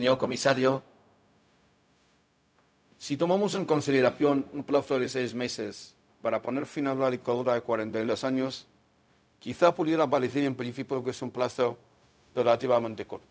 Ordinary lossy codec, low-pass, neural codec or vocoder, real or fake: none; none; codec, 16 kHz, 0.4 kbps, LongCat-Audio-Codec; fake